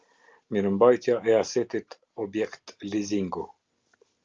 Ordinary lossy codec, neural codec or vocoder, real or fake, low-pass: Opus, 24 kbps; none; real; 7.2 kHz